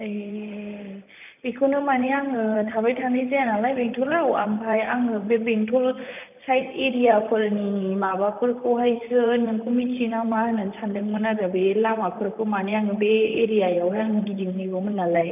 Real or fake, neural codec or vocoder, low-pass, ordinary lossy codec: fake; vocoder, 44.1 kHz, 128 mel bands, Pupu-Vocoder; 3.6 kHz; none